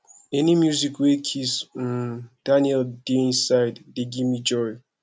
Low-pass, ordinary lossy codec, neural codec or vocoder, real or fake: none; none; none; real